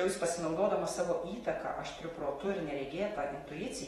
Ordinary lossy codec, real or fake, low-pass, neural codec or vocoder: AAC, 32 kbps; fake; 19.8 kHz; vocoder, 48 kHz, 128 mel bands, Vocos